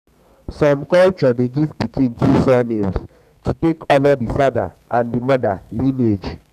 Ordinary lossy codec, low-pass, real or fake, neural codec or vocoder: none; 14.4 kHz; fake; codec, 32 kHz, 1.9 kbps, SNAC